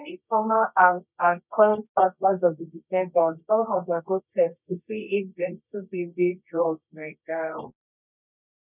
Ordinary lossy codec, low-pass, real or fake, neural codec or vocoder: none; 3.6 kHz; fake; codec, 24 kHz, 0.9 kbps, WavTokenizer, medium music audio release